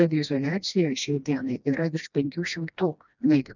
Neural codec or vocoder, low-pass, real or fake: codec, 16 kHz, 1 kbps, FreqCodec, smaller model; 7.2 kHz; fake